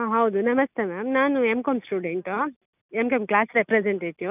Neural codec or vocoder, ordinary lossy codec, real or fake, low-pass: none; none; real; 3.6 kHz